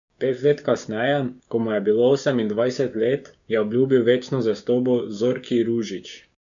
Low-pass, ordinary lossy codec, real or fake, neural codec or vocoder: 7.2 kHz; none; fake; codec, 16 kHz, 6 kbps, DAC